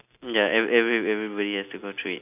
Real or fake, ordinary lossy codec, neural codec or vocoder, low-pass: real; none; none; 3.6 kHz